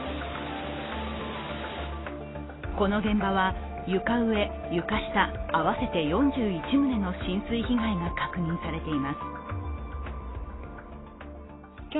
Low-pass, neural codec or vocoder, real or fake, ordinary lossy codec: 7.2 kHz; none; real; AAC, 16 kbps